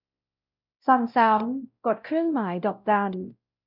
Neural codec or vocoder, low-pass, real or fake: codec, 16 kHz, 0.5 kbps, X-Codec, WavLM features, trained on Multilingual LibriSpeech; 5.4 kHz; fake